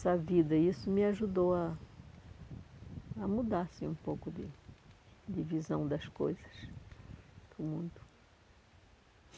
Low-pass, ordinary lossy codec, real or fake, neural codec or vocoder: none; none; real; none